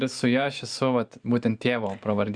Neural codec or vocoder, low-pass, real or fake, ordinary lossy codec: vocoder, 44.1 kHz, 128 mel bands every 512 samples, BigVGAN v2; 9.9 kHz; fake; Opus, 64 kbps